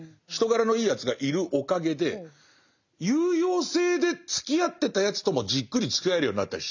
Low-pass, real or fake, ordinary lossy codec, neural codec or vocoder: 7.2 kHz; real; none; none